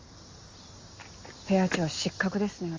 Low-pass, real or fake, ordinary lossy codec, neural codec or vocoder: 7.2 kHz; real; Opus, 32 kbps; none